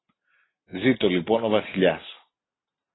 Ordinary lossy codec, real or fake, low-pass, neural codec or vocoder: AAC, 16 kbps; real; 7.2 kHz; none